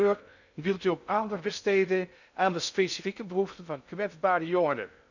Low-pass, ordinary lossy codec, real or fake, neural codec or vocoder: 7.2 kHz; none; fake; codec, 16 kHz in and 24 kHz out, 0.6 kbps, FocalCodec, streaming, 2048 codes